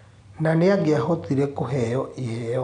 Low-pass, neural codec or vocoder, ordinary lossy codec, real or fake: 9.9 kHz; none; none; real